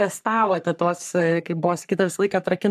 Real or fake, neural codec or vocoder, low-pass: fake; codec, 44.1 kHz, 3.4 kbps, Pupu-Codec; 14.4 kHz